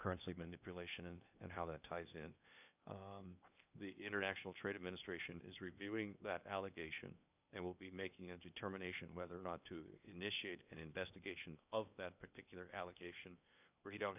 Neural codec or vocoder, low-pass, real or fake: codec, 16 kHz in and 24 kHz out, 0.8 kbps, FocalCodec, streaming, 65536 codes; 3.6 kHz; fake